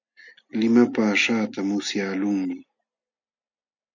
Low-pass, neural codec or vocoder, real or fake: 7.2 kHz; none; real